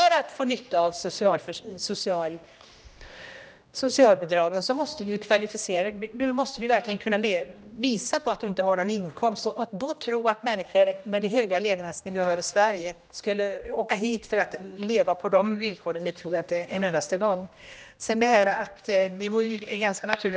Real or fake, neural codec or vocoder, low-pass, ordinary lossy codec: fake; codec, 16 kHz, 1 kbps, X-Codec, HuBERT features, trained on general audio; none; none